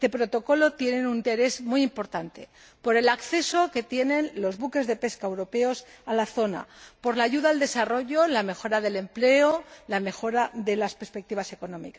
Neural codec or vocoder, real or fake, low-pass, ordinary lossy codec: none; real; none; none